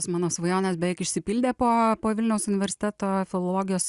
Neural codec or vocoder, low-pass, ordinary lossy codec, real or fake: none; 10.8 kHz; Opus, 64 kbps; real